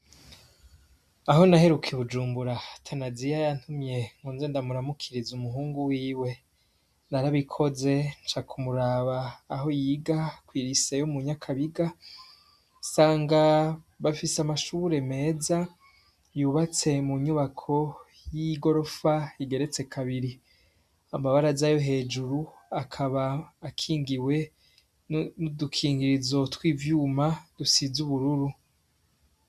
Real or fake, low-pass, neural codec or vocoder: real; 14.4 kHz; none